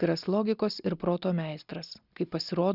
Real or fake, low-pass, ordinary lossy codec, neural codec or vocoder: real; 5.4 kHz; Opus, 64 kbps; none